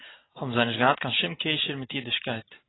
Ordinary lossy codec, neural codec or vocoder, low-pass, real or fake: AAC, 16 kbps; none; 7.2 kHz; real